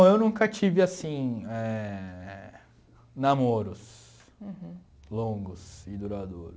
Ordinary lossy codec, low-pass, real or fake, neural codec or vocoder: none; none; real; none